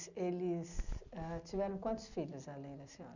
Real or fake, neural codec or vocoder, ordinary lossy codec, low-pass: real; none; none; 7.2 kHz